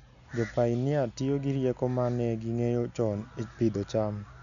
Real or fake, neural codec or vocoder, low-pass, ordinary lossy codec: real; none; 7.2 kHz; none